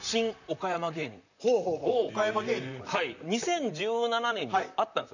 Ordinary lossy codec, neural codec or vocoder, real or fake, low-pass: none; vocoder, 44.1 kHz, 128 mel bands, Pupu-Vocoder; fake; 7.2 kHz